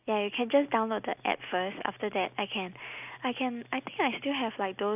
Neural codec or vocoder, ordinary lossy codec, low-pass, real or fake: none; none; 3.6 kHz; real